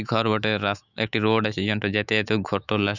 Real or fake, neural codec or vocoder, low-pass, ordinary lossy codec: fake; autoencoder, 48 kHz, 128 numbers a frame, DAC-VAE, trained on Japanese speech; 7.2 kHz; none